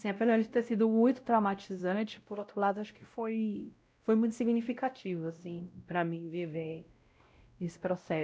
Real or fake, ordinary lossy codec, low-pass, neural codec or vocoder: fake; none; none; codec, 16 kHz, 0.5 kbps, X-Codec, WavLM features, trained on Multilingual LibriSpeech